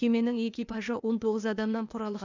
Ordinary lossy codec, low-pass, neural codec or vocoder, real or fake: none; 7.2 kHz; codec, 16 kHz, 0.8 kbps, ZipCodec; fake